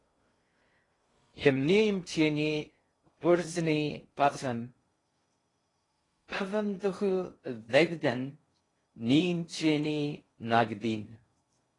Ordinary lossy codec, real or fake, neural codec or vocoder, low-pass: AAC, 32 kbps; fake; codec, 16 kHz in and 24 kHz out, 0.6 kbps, FocalCodec, streaming, 2048 codes; 10.8 kHz